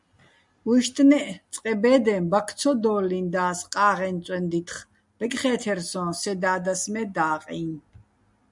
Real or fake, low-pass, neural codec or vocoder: real; 10.8 kHz; none